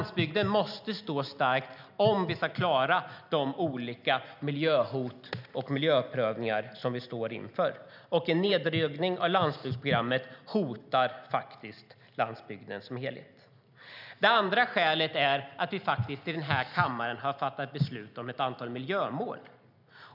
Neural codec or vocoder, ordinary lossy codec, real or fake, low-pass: none; none; real; 5.4 kHz